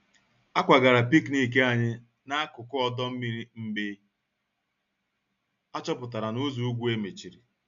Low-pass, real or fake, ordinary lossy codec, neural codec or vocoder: 7.2 kHz; real; none; none